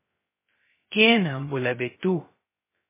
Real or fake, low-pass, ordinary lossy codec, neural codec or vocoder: fake; 3.6 kHz; MP3, 16 kbps; codec, 16 kHz, 0.2 kbps, FocalCodec